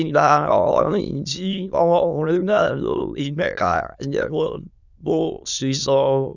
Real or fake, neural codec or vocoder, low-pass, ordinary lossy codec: fake; autoencoder, 22.05 kHz, a latent of 192 numbers a frame, VITS, trained on many speakers; 7.2 kHz; none